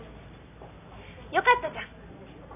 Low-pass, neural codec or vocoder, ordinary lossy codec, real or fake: 3.6 kHz; none; none; real